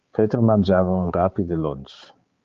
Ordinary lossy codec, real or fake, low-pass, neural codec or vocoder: Opus, 32 kbps; fake; 7.2 kHz; codec, 16 kHz, 4 kbps, X-Codec, HuBERT features, trained on balanced general audio